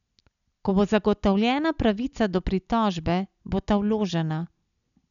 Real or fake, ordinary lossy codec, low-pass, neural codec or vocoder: real; none; 7.2 kHz; none